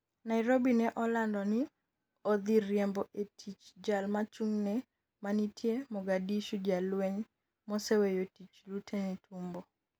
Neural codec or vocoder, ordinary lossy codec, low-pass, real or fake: none; none; none; real